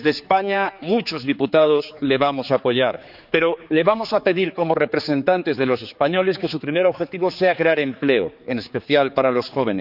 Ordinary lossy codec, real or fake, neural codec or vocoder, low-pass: none; fake; codec, 16 kHz, 4 kbps, X-Codec, HuBERT features, trained on general audio; 5.4 kHz